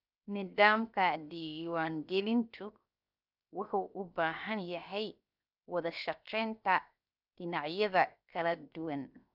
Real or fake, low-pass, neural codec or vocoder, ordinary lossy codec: fake; 5.4 kHz; codec, 16 kHz, 0.7 kbps, FocalCodec; none